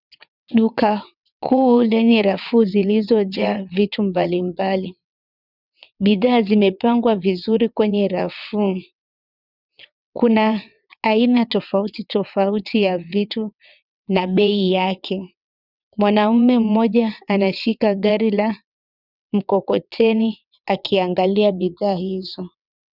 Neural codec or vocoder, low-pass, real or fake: vocoder, 22.05 kHz, 80 mel bands, WaveNeXt; 5.4 kHz; fake